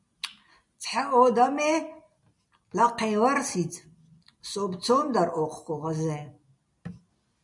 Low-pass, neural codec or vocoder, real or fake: 10.8 kHz; none; real